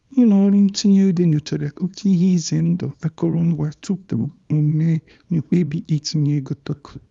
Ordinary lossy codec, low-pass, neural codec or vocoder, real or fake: none; 10.8 kHz; codec, 24 kHz, 0.9 kbps, WavTokenizer, small release; fake